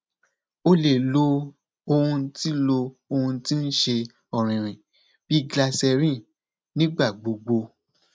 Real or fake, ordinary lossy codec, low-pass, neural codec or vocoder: real; none; none; none